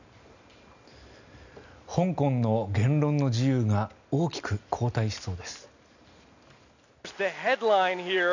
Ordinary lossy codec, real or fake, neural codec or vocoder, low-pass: none; real; none; 7.2 kHz